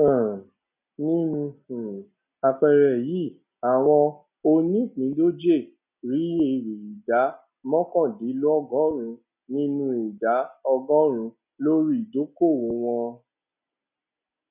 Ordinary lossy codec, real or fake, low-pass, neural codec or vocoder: none; real; 3.6 kHz; none